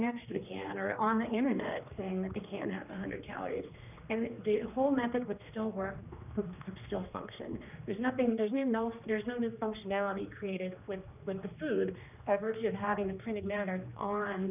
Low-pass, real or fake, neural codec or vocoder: 3.6 kHz; fake; codec, 16 kHz, 2 kbps, X-Codec, HuBERT features, trained on general audio